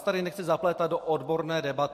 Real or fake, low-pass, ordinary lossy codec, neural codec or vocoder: real; 14.4 kHz; MP3, 64 kbps; none